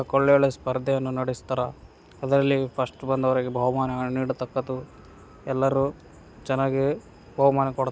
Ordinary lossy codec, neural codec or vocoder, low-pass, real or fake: none; none; none; real